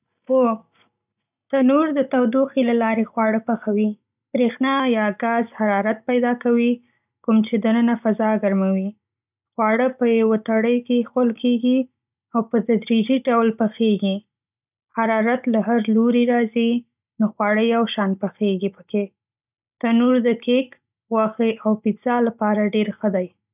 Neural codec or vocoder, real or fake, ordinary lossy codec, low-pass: none; real; none; 3.6 kHz